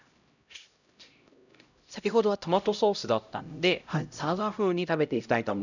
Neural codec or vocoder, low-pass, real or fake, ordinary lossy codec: codec, 16 kHz, 0.5 kbps, X-Codec, HuBERT features, trained on LibriSpeech; 7.2 kHz; fake; none